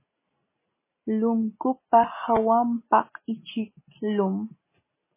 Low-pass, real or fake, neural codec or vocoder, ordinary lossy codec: 3.6 kHz; real; none; MP3, 16 kbps